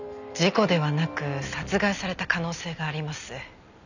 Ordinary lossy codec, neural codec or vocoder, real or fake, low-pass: none; none; real; 7.2 kHz